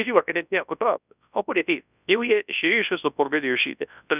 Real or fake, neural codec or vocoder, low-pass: fake; codec, 24 kHz, 0.9 kbps, WavTokenizer, large speech release; 3.6 kHz